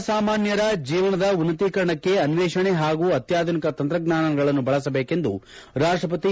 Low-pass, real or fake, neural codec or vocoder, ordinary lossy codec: none; real; none; none